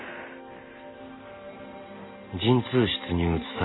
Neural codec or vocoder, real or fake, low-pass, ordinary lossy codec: none; real; 7.2 kHz; AAC, 16 kbps